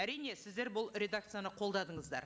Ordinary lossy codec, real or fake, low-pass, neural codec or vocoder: none; real; none; none